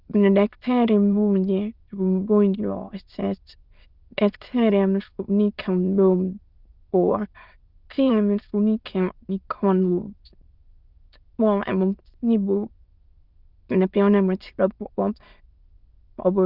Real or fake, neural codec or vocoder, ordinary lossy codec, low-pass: fake; autoencoder, 22.05 kHz, a latent of 192 numbers a frame, VITS, trained on many speakers; Opus, 24 kbps; 5.4 kHz